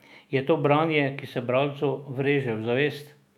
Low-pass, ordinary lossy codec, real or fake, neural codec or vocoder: 19.8 kHz; none; fake; autoencoder, 48 kHz, 128 numbers a frame, DAC-VAE, trained on Japanese speech